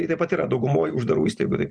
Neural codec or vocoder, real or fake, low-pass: none; real; 9.9 kHz